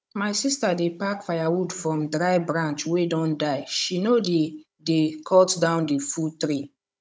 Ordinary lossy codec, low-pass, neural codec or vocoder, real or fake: none; none; codec, 16 kHz, 16 kbps, FunCodec, trained on Chinese and English, 50 frames a second; fake